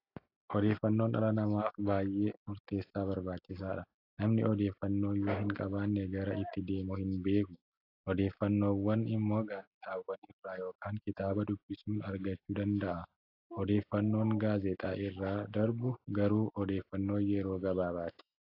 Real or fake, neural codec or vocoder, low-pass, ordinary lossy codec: real; none; 5.4 kHz; AAC, 32 kbps